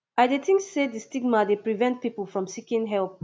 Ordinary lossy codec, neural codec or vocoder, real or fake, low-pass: none; none; real; none